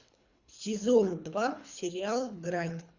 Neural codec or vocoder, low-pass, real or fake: codec, 24 kHz, 3 kbps, HILCodec; 7.2 kHz; fake